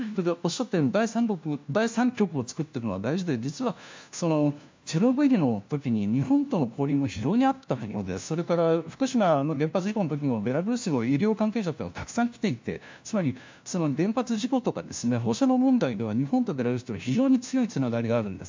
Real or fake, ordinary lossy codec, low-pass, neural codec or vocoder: fake; MP3, 64 kbps; 7.2 kHz; codec, 16 kHz, 1 kbps, FunCodec, trained on LibriTTS, 50 frames a second